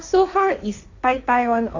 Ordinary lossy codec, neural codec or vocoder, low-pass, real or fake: none; codec, 16 kHz, 1.1 kbps, Voila-Tokenizer; 7.2 kHz; fake